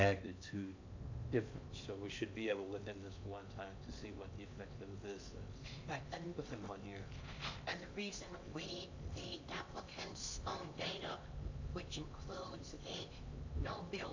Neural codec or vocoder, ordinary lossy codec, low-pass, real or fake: codec, 16 kHz in and 24 kHz out, 0.8 kbps, FocalCodec, streaming, 65536 codes; AAC, 48 kbps; 7.2 kHz; fake